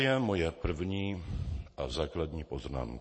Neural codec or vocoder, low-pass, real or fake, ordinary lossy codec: none; 9.9 kHz; real; MP3, 32 kbps